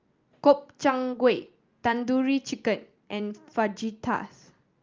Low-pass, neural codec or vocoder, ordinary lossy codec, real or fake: 7.2 kHz; none; Opus, 32 kbps; real